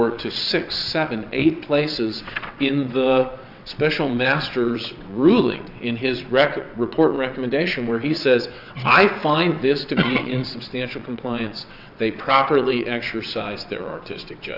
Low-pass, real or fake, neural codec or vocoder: 5.4 kHz; fake; vocoder, 22.05 kHz, 80 mel bands, WaveNeXt